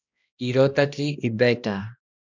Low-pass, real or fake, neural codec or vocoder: 7.2 kHz; fake; codec, 16 kHz, 1 kbps, X-Codec, HuBERT features, trained on balanced general audio